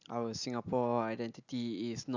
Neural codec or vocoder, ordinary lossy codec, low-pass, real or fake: none; none; 7.2 kHz; real